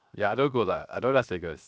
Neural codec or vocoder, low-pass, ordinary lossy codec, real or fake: codec, 16 kHz, 0.7 kbps, FocalCodec; none; none; fake